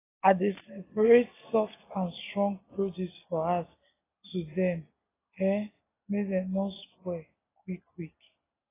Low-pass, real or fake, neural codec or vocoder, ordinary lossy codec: 3.6 kHz; real; none; AAC, 16 kbps